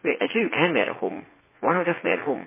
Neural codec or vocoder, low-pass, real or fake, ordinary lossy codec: vocoder, 44.1 kHz, 80 mel bands, Vocos; 3.6 kHz; fake; MP3, 16 kbps